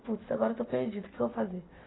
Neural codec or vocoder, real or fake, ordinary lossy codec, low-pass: none; real; AAC, 16 kbps; 7.2 kHz